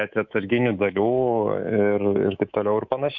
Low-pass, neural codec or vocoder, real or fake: 7.2 kHz; codec, 24 kHz, 3.1 kbps, DualCodec; fake